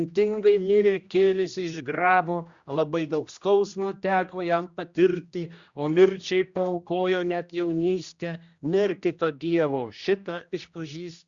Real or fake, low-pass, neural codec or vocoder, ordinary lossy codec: fake; 7.2 kHz; codec, 16 kHz, 1 kbps, X-Codec, HuBERT features, trained on general audio; Opus, 64 kbps